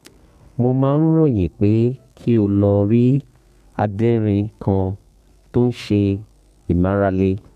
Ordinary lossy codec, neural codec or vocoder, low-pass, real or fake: none; codec, 32 kHz, 1.9 kbps, SNAC; 14.4 kHz; fake